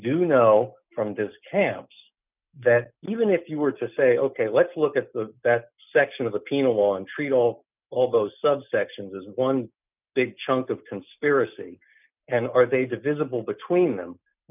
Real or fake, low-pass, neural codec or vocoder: real; 3.6 kHz; none